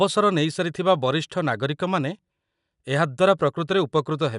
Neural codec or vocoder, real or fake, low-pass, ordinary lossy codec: none; real; 10.8 kHz; none